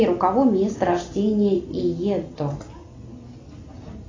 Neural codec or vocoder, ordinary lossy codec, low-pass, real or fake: none; AAC, 32 kbps; 7.2 kHz; real